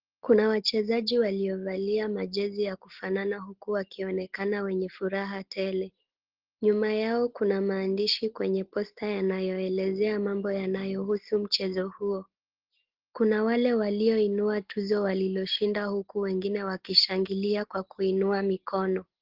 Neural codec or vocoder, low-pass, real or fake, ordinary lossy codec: none; 5.4 kHz; real; Opus, 16 kbps